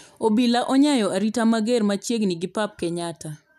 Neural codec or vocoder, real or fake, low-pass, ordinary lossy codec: none; real; 14.4 kHz; none